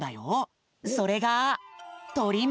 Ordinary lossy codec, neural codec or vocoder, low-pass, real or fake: none; none; none; real